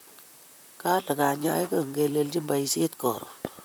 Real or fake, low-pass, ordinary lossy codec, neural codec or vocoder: fake; none; none; vocoder, 44.1 kHz, 128 mel bands, Pupu-Vocoder